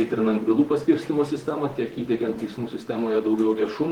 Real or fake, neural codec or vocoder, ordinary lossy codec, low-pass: fake; vocoder, 44.1 kHz, 128 mel bands, Pupu-Vocoder; Opus, 16 kbps; 19.8 kHz